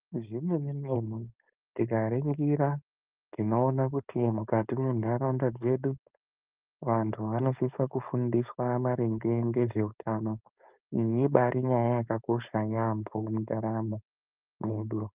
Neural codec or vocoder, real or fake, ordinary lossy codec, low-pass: codec, 16 kHz, 4.8 kbps, FACodec; fake; Opus, 24 kbps; 3.6 kHz